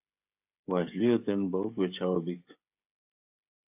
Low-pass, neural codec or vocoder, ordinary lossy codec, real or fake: 3.6 kHz; codec, 16 kHz, 16 kbps, FreqCodec, smaller model; AAC, 32 kbps; fake